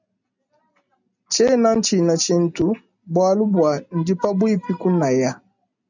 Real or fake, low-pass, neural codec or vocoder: real; 7.2 kHz; none